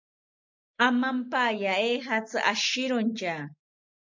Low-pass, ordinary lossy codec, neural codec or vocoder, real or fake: 7.2 kHz; MP3, 48 kbps; none; real